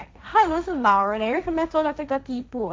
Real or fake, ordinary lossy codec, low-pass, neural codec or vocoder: fake; none; none; codec, 16 kHz, 1.1 kbps, Voila-Tokenizer